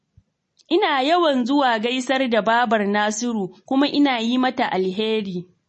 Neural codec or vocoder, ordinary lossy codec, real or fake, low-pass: none; MP3, 32 kbps; real; 10.8 kHz